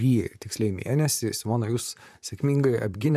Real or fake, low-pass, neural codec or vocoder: fake; 14.4 kHz; vocoder, 44.1 kHz, 128 mel bands, Pupu-Vocoder